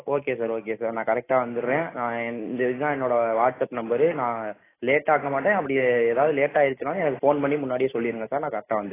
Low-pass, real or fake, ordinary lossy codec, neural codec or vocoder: 3.6 kHz; real; AAC, 16 kbps; none